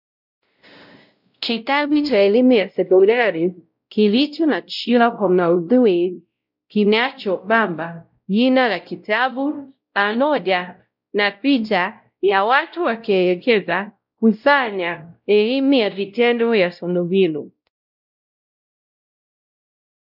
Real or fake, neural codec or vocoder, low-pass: fake; codec, 16 kHz, 0.5 kbps, X-Codec, WavLM features, trained on Multilingual LibriSpeech; 5.4 kHz